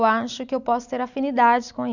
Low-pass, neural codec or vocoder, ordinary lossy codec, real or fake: 7.2 kHz; none; none; real